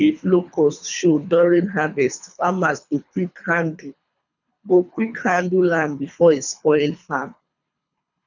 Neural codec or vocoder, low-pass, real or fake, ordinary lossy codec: codec, 24 kHz, 3 kbps, HILCodec; 7.2 kHz; fake; none